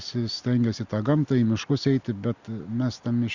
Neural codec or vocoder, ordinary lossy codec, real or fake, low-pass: none; Opus, 64 kbps; real; 7.2 kHz